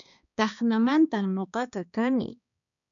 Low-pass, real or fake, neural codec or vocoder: 7.2 kHz; fake; codec, 16 kHz, 2 kbps, X-Codec, HuBERT features, trained on balanced general audio